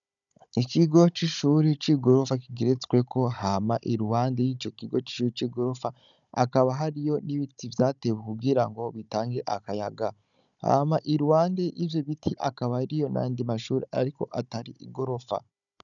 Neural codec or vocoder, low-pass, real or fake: codec, 16 kHz, 16 kbps, FunCodec, trained on Chinese and English, 50 frames a second; 7.2 kHz; fake